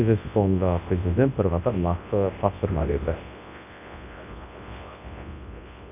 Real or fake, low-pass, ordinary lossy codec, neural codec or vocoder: fake; 3.6 kHz; none; codec, 24 kHz, 0.9 kbps, WavTokenizer, large speech release